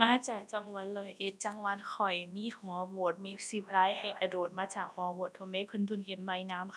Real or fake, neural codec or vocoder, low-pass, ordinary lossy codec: fake; codec, 24 kHz, 0.9 kbps, WavTokenizer, large speech release; none; none